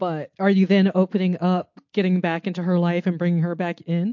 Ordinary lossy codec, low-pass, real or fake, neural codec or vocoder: MP3, 48 kbps; 7.2 kHz; real; none